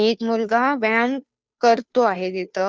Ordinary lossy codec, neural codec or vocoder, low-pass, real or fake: none; codec, 16 kHz, 2 kbps, FunCodec, trained on Chinese and English, 25 frames a second; none; fake